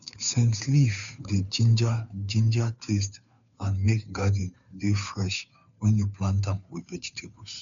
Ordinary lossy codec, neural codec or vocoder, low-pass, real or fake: none; codec, 16 kHz, 2 kbps, FunCodec, trained on Chinese and English, 25 frames a second; 7.2 kHz; fake